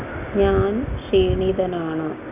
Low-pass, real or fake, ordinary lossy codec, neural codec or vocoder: 3.6 kHz; real; AAC, 32 kbps; none